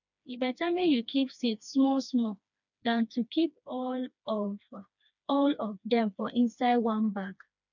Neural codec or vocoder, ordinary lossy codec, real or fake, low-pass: codec, 16 kHz, 2 kbps, FreqCodec, smaller model; none; fake; 7.2 kHz